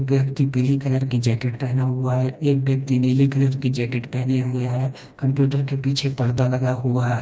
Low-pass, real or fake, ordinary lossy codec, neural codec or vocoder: none; fake; none; codec, 16 kHz, 1 kbps, FreqCodec, smaller model